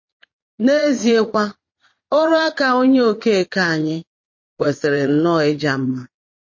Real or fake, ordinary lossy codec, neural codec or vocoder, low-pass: fake; MP3, 32 kbps; vocoder, 22.05 kHz, 80 mel bands, Vocos; 7.2 kHz